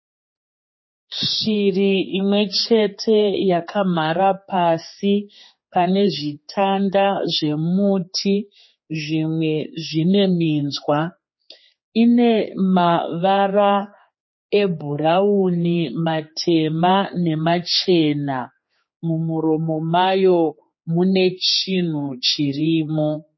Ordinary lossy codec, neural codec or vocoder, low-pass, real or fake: MP3, 24 kbps; codec, 16 kHz, 4 kbps, X-Codec, HuBERT features, trained on general audio; 7.2 kHz; fake